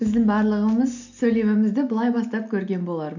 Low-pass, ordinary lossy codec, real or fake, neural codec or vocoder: 7.2 kHz; none; real; none